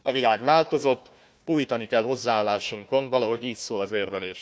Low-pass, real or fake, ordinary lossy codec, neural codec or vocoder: none; fake; none; codec, 16 kHz, 1 kbps, FunCodec, trained on Chinese and English, 50 frames a second